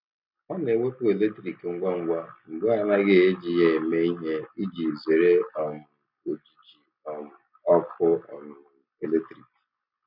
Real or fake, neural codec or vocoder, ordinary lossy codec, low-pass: real; none; none; 5.4 kHz